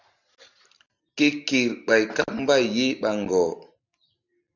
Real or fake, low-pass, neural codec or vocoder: real; 7.2 kHz; none